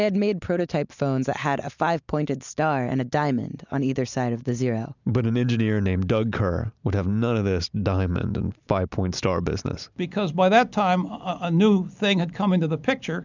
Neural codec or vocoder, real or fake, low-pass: none; real; 7.2 kHz